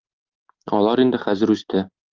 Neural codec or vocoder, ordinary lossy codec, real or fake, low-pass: none; Opus, 32 kbps; real; 7.2 kHz